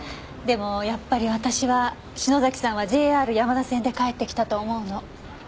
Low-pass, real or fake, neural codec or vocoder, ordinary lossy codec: none; real; none; none